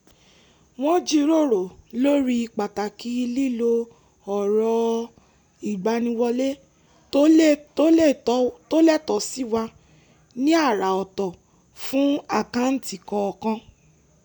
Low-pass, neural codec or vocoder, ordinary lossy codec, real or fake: 19.8 kHz; none; none; real